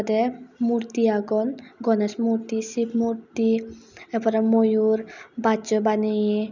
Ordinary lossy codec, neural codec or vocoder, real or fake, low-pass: none; none; real; 7.2 kHz